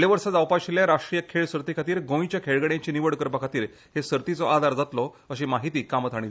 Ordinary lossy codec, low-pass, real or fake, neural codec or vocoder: none; none; real; none